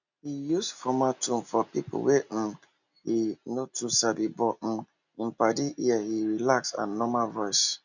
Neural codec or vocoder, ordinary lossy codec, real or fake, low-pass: none; none; real; 7.2 kHz